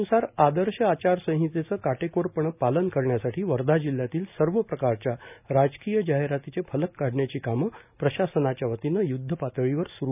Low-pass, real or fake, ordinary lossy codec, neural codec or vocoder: 3.6 kHz; real; none; none